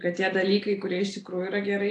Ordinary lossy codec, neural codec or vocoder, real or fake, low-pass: AAC, 48 kbps; none; real; 10.8 kHz